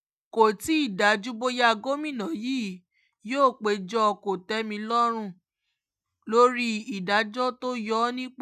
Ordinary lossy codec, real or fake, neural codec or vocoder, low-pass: none; real; none; 14.4 kHz